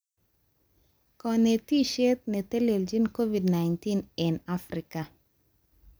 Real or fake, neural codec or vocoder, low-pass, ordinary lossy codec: real; none; none; none